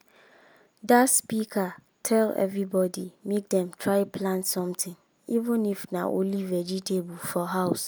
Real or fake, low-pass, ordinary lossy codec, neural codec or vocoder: real; none; none; none